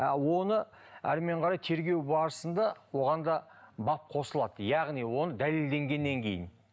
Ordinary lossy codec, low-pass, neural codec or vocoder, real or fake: none; none; none; real